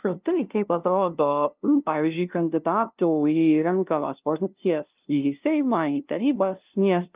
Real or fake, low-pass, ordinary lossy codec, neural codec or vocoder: fake; 3.6 kHz; Opus, 24 kbps; codec, 16 kHz, 0.5 kbps, FunCodec, trained on LibriTTS, 25 frames a second